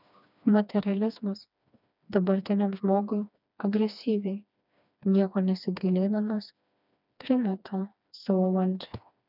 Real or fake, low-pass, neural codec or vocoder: fake; 5.4 kHz; codec, 16 kHz, 2 kbps, FreqCodec, smaller model